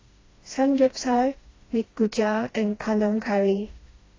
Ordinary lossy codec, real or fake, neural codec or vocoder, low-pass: AAC, 32 kbps; fake; codec, 16 kHz, 1 kbps, FreqCodec, smaller model; 7.2 kHz